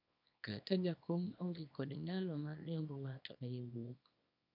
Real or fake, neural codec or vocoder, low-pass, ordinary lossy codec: fake; codec, 24 kHz, 0.9 kbps, WavTokenizer, small release; 5.4 kHz; none